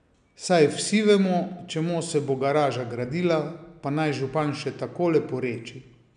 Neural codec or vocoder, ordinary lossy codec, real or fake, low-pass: none; none; real; 9.9 kHz